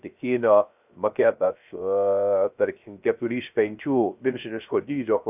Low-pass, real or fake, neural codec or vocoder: 3.6 kHz; fake; codec, 16 kHz, 0.3 kbps, FocalCodec